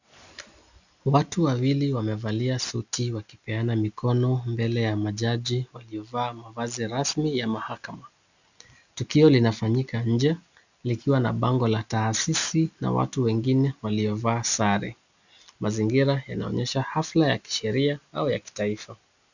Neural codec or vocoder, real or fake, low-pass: none; real; 7.2 kHz